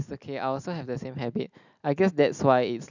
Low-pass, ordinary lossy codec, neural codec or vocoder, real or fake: 7.2 kHz; none; none; real